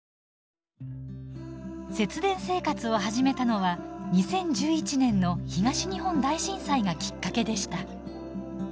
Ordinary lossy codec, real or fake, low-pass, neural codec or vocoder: none; real; none; none